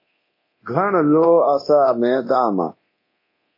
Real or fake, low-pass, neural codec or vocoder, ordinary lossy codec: fake; 5.4 kHz; codec, 24 kHz, 0.9 kbps, DualCodec; MP3, 24 kbps